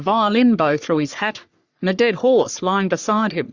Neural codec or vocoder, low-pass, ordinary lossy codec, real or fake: codec, 44.1 kHz, 3.4 kbps, Pupu-Codec; 7.2 kHz; Opus, 64 kbps; fake